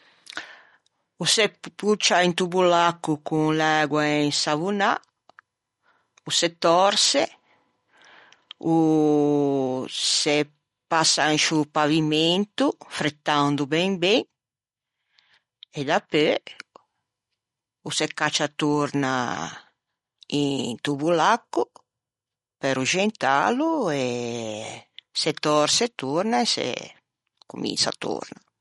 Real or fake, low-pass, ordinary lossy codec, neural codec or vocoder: real; 19.8 kHz; MP3, 48 kbps; none